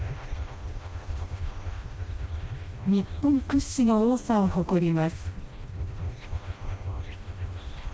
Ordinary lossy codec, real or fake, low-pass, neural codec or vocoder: none; fake; none; codec, 16 kHz, 1 kbps, FreqCodec, smaller model